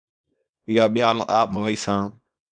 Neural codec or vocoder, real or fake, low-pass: codec, 24 kHz, 0.9 kbps, WavTokenizer, small release; fake; 9.9 kHz